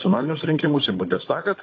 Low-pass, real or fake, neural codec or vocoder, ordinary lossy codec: 7.2 kHz; fake; codec, 16 kHz, 16 kbps, FunCodec, trained on Chinese and English, 50 frames a second; AAC, 32 kbps